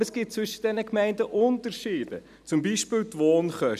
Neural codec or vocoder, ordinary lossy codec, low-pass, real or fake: none; none; 14.4 kHz; real